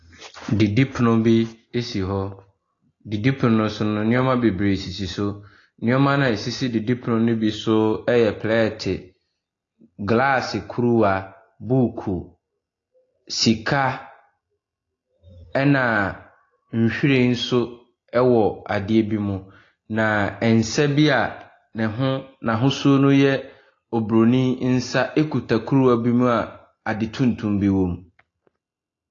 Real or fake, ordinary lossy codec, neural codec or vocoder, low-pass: real; AAC, 32 kbps; none; 7.2 kHz